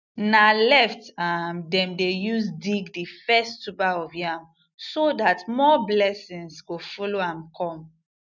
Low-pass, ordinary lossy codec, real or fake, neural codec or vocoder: 7.2 kHz; none; real; none